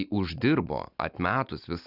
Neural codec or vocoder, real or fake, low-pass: none; real; 5.4 kHz